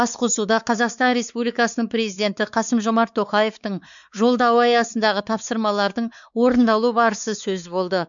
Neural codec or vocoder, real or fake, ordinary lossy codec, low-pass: codec, 16 kHz, 4 kbps, X-Codec, WavLM features, trained on Multilingual LibriSpeech; fake; MP3, 96 kbps; 7.2 kHz